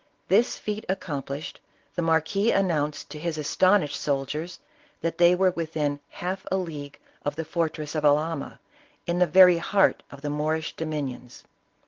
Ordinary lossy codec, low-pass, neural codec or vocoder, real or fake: Opus, 16 kbps; 7.2 kHz; none; real